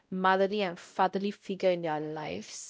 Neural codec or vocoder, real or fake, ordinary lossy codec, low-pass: codec, 16 kHz, 0.5 kbps, X-Codec, WavLM features, trained on Multilingual LibriSpeech; fake; none; none